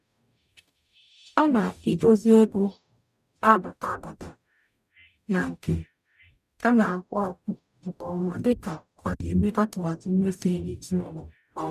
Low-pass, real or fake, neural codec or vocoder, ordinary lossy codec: 14.4 kHz; fake; codec, 44.1 kHz, 0.9 kbps, DAC; none